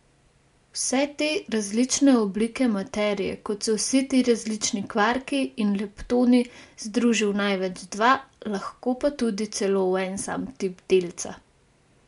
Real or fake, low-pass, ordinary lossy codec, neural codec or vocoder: real; 10.8 kHz; MP3, 64 kbps; none